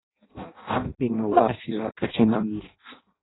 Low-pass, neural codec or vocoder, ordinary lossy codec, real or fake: 7.2 kHz; codec, 16 kHz in and 24 kHz out, 0.6 kbps, FireRedTTS-2 codec; AAC, 16 kbps; fake